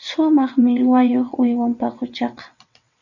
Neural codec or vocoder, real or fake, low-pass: vocoder, 22.05 kHz, 80 mel bands, WaveNeXt; fake; 7.2 kHz